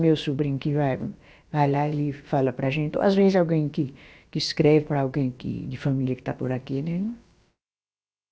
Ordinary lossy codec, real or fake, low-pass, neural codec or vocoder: none; fake; none; codec, 16 kHz, about 1 kbps, DyCAST, with the encoder's durations